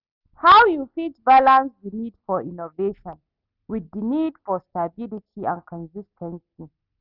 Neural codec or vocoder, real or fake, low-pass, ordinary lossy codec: none; real; 5.4 kHz; none